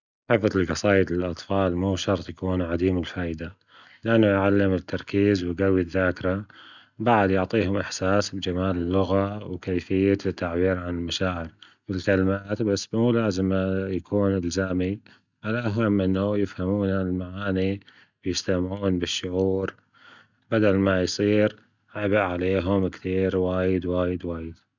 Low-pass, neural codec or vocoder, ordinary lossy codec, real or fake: 7.2 kHz; none; none; real